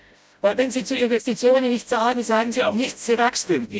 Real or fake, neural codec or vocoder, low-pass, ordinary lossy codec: fake; codec, 16 kHz, 0.5 kbps, FreqCodec, smaller model; none; none